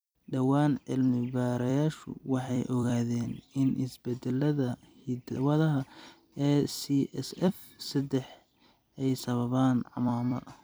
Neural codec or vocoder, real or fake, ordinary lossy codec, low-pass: vocoder, 44.1 kHz, 128 mel bands every 256 samples, BigVGAN v2; fake; none; none